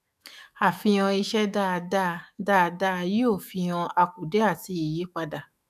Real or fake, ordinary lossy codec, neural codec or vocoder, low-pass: fake; none; autoencoder, 48 kHz, 128 numbers a frame, DAC-VAE, trained on Japanese speech; 14.4 kHz